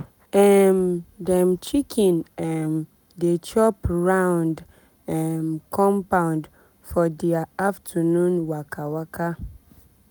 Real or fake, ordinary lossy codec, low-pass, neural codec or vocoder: real; none; none; none